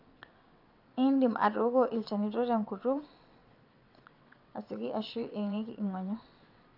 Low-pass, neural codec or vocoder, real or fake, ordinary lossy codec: 5.4 kHz; vocoder, 22.05 kHz, 80 mel bands, WaveNeXt; fake; AAC, 48 kbps